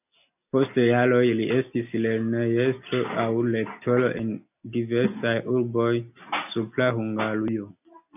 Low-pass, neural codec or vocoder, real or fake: 3.6 kHz; none; real